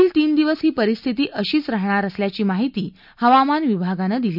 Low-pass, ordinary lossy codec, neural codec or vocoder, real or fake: 5.4 kHz; none; none; real